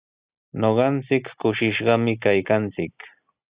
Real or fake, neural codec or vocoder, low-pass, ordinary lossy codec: real; none; 3.6 kHz; Opus, 64 kbps